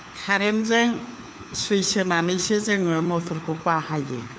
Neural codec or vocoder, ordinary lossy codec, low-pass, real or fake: codec, 16 kHz, 4 kbps, FunCodec, trained on LibriTTS, 50 frames a second; none; none; fake